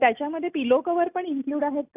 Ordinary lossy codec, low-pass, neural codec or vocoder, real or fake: none; 3.6 kHz; none; real